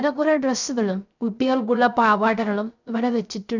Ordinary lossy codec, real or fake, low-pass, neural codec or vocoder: none; fake; 7.2 kHz; codec, 16 kHz, 0.3 kbps, FocalCodec